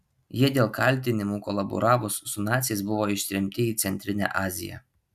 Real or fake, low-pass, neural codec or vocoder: real; 14.4 kHz; none